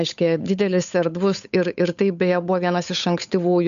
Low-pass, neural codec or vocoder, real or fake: 7.2 kHz; codec, 16 kHz, 8 kbps, FunCodec, trained on Chinese and English, 25 frames a second; fake